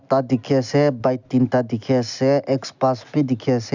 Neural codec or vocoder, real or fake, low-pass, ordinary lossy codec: none; real; 7.2 kHz; none